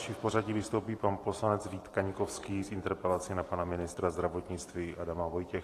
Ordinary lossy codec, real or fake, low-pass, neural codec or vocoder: AAC, 48 kbps; fake; 14.4 kHz; vocoder, 44.1 kHz, 128 mel bands every 512 samples, BigVGAN v2